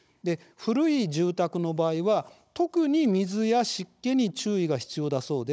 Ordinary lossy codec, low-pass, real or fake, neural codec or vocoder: none; none; fake; codec, 16 kHz, 16 kbps, FunCodec, trained on Chinese and English, 50 frames a second